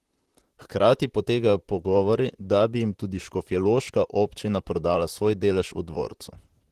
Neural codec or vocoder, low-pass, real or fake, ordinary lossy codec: vocoder, 44.1 kHz, 128 mel bands, Pupu-Vocoder; 14.4 kHz; fake; Opus, 16 kbps